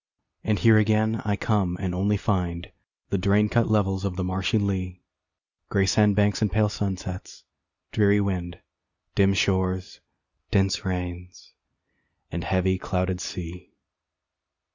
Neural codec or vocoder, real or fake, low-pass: none; real; 7.2 kHz